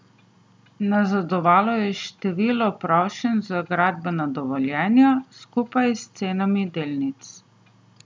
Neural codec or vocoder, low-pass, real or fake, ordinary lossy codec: none; none; real; none